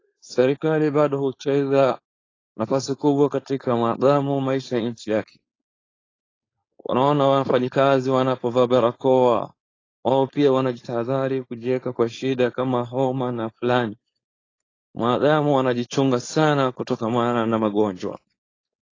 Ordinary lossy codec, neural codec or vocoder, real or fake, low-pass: AAC, 32 kbps; codec, 16 kHz, 4.8 kbps, FACodec; fake; 7.2 kHz